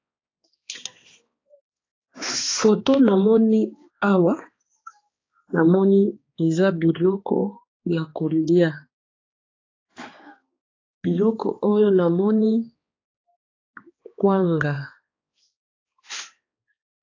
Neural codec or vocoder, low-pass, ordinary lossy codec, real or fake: codec, 16 kHz, 2 kbps, X-Codec, HuBERT features, trained on balanced general audio; 7.2 kHz; AAC, 32 kbps; fake